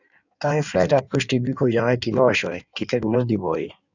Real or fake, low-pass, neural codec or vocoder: fake; 7.2 kHz; codec, 16 kHz in and 24 kHz out, 1.1 kbps, FireRedTTS-2 codec